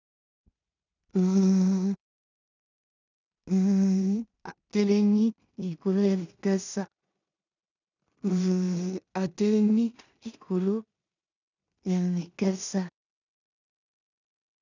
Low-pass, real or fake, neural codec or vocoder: 7.2 kHz; fake; codec, 16 kHz in and 24 kHz out, 0.4 kbps, LongCat-Audio-Codec, two codebook decoder